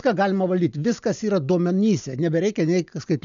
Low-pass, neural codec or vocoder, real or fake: 7.2 kHz; none; real